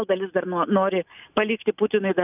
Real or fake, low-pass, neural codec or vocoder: real; 3.6 kHz; none